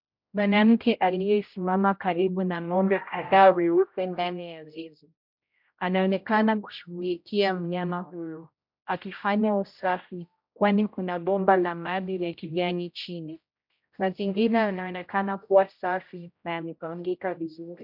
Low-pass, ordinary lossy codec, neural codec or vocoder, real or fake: 5.4 kHz; MP3, 48 kbps; codec, 16 kHz, 0.5 kbps, X-Codec, HuBERT features, trained on general audio; fake